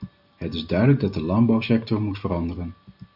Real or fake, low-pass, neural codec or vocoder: real; 5.4 kHz; none